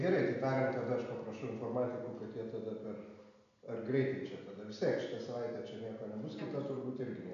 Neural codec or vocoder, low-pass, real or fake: none; 7.2 kHz; real